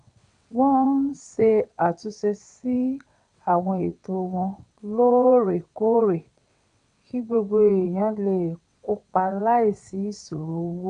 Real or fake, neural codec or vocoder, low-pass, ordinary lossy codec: fake; vocoder, 22.05 kHz, 80 mel bands, WaveNeXt; 9.9 kHz; none